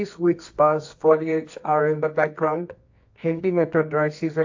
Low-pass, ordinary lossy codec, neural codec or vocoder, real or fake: 7.2 kHz; AAC, 48 kbps; codec, 24 kHz, 0.9 kbps, WavTokenizer, medium music audio release; fake